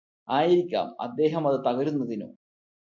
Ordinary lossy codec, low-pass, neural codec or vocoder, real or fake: MP3, 48 kbps; 7.2 kHz; none; real